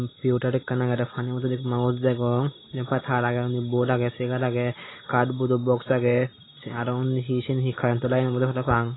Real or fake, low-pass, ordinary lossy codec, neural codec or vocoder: real; 7.2 kHz; AAC, 16 kbps; none